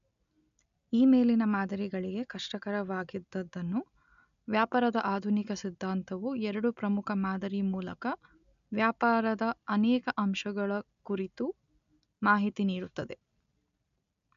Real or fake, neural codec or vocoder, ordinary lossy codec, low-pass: real; none; none; 7.2 kHz